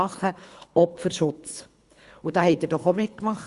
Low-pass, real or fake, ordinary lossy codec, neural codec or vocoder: 10.8 kHz; fake; Opus, 64 kbps; codec, 24 kHz, 3 kbps, HILCodec